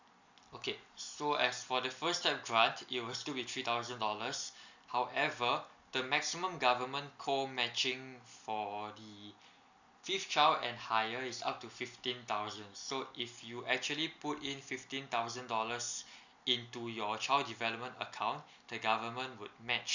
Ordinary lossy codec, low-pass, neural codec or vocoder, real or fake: none; 7.2 kHz; none; real